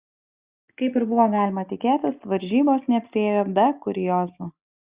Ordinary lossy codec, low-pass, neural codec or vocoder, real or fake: Opus, 64 kbps; 3.6 kHz; none; real